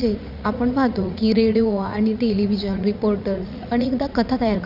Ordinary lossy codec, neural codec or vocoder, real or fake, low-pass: none; vocoder, 44.1 kHz, 80 mel bands, Vocos; fake; 5.4 kHz